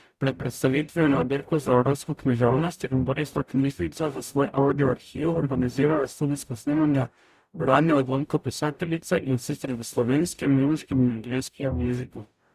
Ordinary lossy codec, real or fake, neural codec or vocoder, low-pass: Opus, 64 kbps; fake; codec, 44.1 kHz, 0.9 kbps, DAC; 14.4 kHz